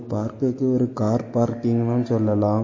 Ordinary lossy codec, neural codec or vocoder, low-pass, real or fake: MP3, 32 kbps; none; 7.2 kHz; real